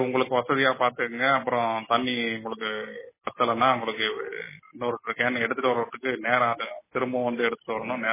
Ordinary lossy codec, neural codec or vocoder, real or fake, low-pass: MP3, 16 kbps; none; real; 3.6 kHz